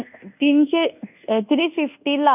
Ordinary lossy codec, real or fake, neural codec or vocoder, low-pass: none; fake; codec, 24 kHz, 1.2 kbps, DualCodec; 3.6 kHz